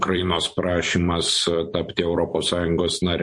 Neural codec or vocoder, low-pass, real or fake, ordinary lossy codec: vocoder, 44.1 kHz, 128 mel bands every 256 samples, BigVGAN v2; 10.8 kHz; fake; MP3, 48 kbps